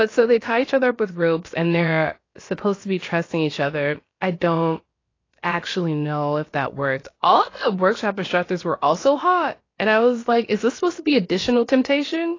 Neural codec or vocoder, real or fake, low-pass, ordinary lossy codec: codec, 16 kHz, about 1 kbps, DyCAST, with the encoder's durations; fake; 7.2 kHz; AAC, 32 kbps